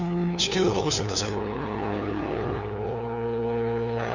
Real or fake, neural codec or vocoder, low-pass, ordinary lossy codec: fake; codec, 16 kHz, 2 kbps, FunCodec, trained on LibriTTS, 25 frames a second; 7.2 kHz; none